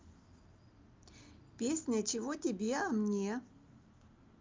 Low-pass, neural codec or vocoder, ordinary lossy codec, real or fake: 7.2 kHz; none; Opus, 24 kbps; real